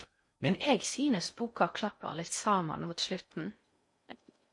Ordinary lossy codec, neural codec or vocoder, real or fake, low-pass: MP3, 48 kbps; codec, 16 kHz in and 24 kHz out, 0.6 kbps, FocalCodec, streaming, 4096 codes; fake; 10.8 kHz